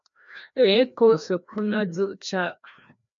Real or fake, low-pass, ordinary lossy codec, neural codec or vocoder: fake; 7.2 kHz; MP3, 64 kbps; codec, 16 kHz, 1 kbps, FreqCodec, larger model